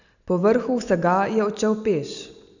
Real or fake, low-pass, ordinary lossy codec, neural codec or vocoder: real; 7.2 kHz; none; none